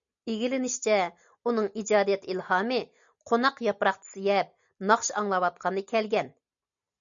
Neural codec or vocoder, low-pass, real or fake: none; 7.2 kHz; real